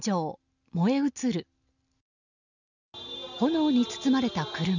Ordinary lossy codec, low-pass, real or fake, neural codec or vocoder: none; 7.2 kHz; real; none